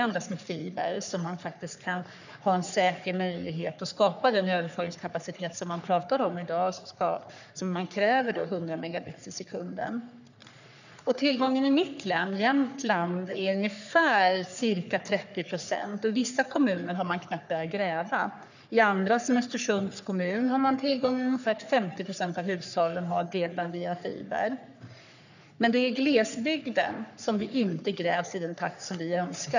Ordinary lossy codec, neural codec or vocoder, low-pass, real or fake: none; codec, 44.1 kHz, 3.4 kbps, Pupu-Codec; 7.2 kHz; fake